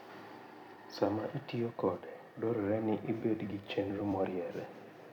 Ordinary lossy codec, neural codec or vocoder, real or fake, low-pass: none; vocoder, 44.1 kHz, 128 mel bands every 256 samples, BigVGAN v2; fake; 19.8 kHz